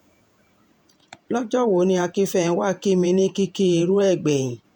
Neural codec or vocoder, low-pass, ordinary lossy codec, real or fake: vocoder, 48 kHz, 128 mel bands, Vocos; 19.8 kHz; none; fake